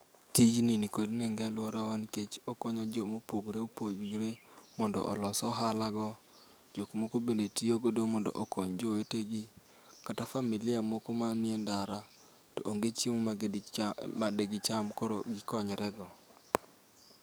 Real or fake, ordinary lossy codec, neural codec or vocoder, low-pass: fake; none; codec, 44.1 kHz, 7.8 kbps, DAC; none